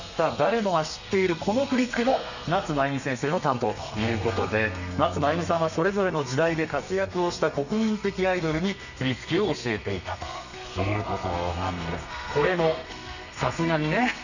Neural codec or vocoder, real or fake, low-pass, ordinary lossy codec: codec, 32 kHz, 1.9 kbps, SNAC; fake; 7.2 kHz; none